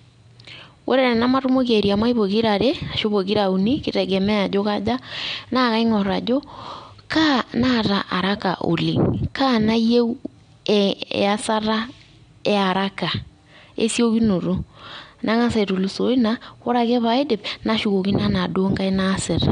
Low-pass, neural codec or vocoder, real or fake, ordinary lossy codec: 9.9 kHz; none; real; MP3, 64 kbps